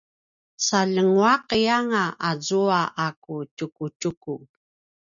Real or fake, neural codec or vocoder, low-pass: real; none; 7.2 kHz